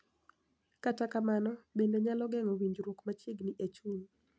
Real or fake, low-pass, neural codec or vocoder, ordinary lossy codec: real; none; none; none